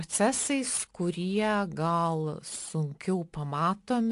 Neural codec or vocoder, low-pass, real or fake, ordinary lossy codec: none; 10.8 kHz; real; AAC, 64 kbps